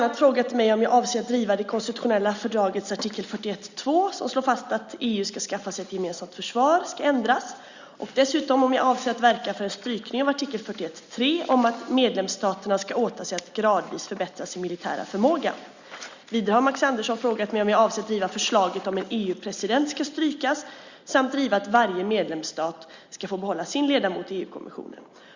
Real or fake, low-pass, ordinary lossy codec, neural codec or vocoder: real; 7.2 kHz; Opus, 64 kbps; none